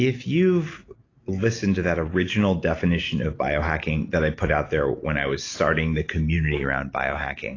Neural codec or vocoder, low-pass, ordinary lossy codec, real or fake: none; 7.2 kHz; AAC, 32 kbps; real